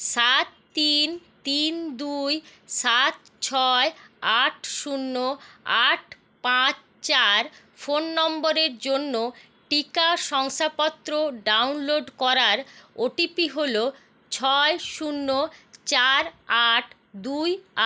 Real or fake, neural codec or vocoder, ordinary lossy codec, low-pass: real; none; none; none